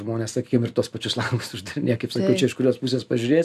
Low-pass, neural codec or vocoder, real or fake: 14.4 kHz; none; real